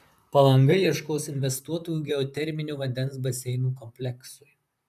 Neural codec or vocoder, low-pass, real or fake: vocoder, 44.1 kHz, 128 mel bands, Pupu-Vocoder; 14.4 kHz; fake